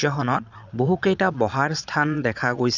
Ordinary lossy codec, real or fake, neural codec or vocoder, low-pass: none; fake; vocoder, 22.05 kHz, 80 mel bands, Vocos; 7.2 kHz